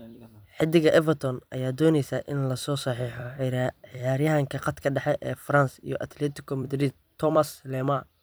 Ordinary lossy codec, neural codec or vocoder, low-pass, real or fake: none; vocoder, 44.1 kHz, 128 mel bands every 256 samples, BigVGAN v2; none; fake